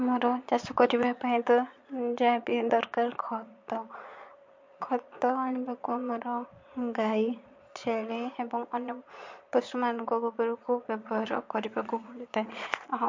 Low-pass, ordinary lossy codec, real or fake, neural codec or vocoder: 7.2 kHz; MP3, 48 kbps; fake; vocoder, 22.05 kHz, 80 mel bands, Vocos